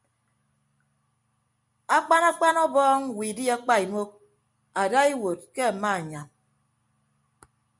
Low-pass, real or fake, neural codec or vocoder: 10.8 kHz; real; none